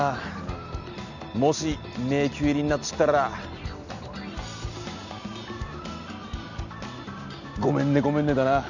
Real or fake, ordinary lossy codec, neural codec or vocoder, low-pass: real; none; none; 7.2 kHz